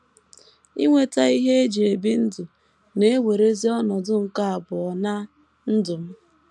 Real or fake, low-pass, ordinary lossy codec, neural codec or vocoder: real; none; none; none